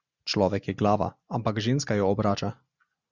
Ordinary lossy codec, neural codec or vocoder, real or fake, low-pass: Opus, 64 kbps; none; real; 7.2 kHz